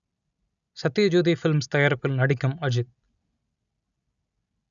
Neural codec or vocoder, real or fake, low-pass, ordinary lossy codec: none; real; 7.2 kHz; none